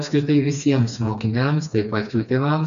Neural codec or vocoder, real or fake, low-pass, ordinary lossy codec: codec, 16 kHz, 2 kbps, FreqCodec, smaller model; fake; 7.2 kHz; MP3, 96 kbps